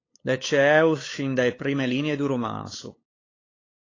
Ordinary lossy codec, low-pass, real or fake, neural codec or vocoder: AAC, 32 kbps; 7.2 kHz; fake; codec, 16 kHz, 8 kbps, FunCodec, trained on LibriTTS, 25 frames a second